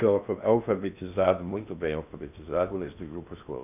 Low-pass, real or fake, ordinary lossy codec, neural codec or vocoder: 3.6 kHz; fake; none; codec, 16 kHz in and 24 kHz out, 0.6 kbps, FocalCodec, streaming, 2048 codes